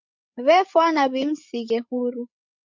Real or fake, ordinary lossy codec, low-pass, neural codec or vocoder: fake; MP3, 48 kbps; 7.2 kHz; codec, 16 kHz, 16 kbps, FreqCodec, larger model